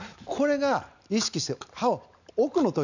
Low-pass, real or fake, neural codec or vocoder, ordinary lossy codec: 7.2 kHz; real; none; none